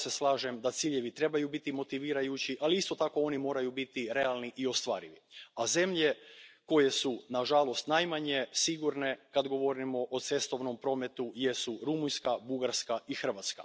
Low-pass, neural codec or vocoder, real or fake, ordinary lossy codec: none; none; real; none